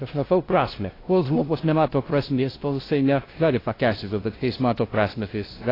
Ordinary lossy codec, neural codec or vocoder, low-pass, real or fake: AAC, 24 kbps; codec, 16 kHz, 0.5 kbps, FunCodec, trained on LibriTTS, 25 frames a second; 5.4 kHz; fake